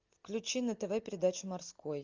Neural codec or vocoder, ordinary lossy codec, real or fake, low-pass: none; Opus, 24 kbps; real; 7.2 kHz